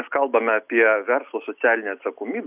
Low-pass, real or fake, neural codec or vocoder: 3.6 kHz; real; none